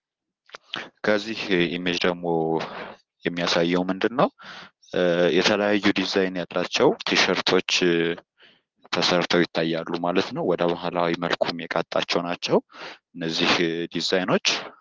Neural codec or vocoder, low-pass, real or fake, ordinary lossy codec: none; 7.2 kHz; real; Opus, 16 kbps